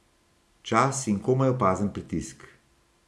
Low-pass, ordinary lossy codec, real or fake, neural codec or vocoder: none; none; real; none